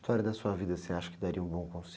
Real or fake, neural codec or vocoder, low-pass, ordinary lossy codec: real; none; none; none